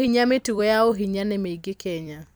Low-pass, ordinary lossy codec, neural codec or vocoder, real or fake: none; none; vocoder, 44.1 kHz, 128 mel bands every 256 samples, BigVGAN v2; fake